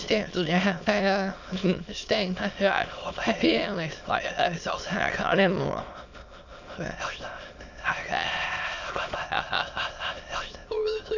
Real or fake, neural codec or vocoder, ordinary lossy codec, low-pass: fake; autoencoder, 22.05 kHz, a latent of 192 numbers a frame, VITS, trained on many speakers; none; 7.2 kHz